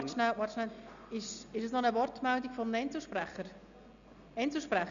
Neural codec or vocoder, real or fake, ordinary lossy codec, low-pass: none; real; MP3, 96 kbps; 7.2 kHz